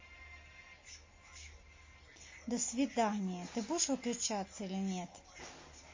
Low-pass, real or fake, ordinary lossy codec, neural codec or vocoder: 7.2 kHz; real; MP3, 32 kbps; none